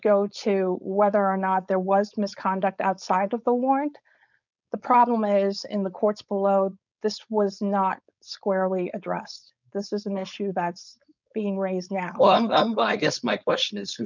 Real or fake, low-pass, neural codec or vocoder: fake; 7.2 kHz; codec, 16 kHz, 4.8 kbps, FACodec